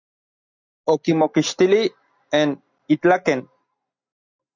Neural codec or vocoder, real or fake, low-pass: none; real; 7.2 kHz